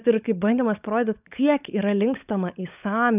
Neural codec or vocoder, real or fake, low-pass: codec, 16 kHz, 16 kbps, FunCodec, trained on LibriTTS, 50 frames a second; fake; 3.6 kHz